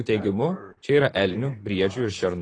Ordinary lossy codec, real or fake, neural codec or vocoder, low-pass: AAC, 32 kbps; fake; vocoder, 44.1 kHz, 128 mel bands, Pupu-Vocoder; 9.9 kHz